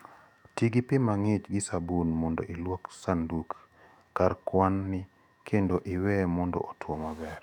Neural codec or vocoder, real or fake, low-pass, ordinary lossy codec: autoencoder, 48 kHz, 128 numbers a frame, DAC-VAE, trained on Japanese speech; fake; 19.8 kHz; none